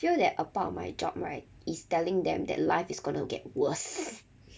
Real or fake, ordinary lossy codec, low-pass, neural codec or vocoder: real; none; none; none